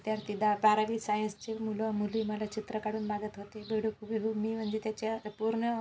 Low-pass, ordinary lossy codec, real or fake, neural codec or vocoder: none; none; real; none